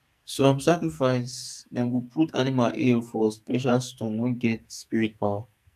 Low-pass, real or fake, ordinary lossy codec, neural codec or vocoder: 14.4 kHz; fake; none; codec, 44.1 kHz, 2.6 kbps, SNAC